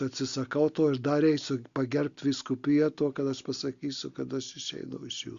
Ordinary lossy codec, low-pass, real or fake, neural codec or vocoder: Opus, 64 kbps; 7.2 kHz; real; none